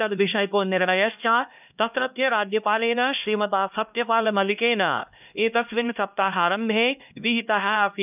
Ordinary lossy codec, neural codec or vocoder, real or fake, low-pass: none; codec, 16 kHz, 1 kbps, X-Codec, HuBERT features, trained on LibriSpeech; fake; 3.6 kHz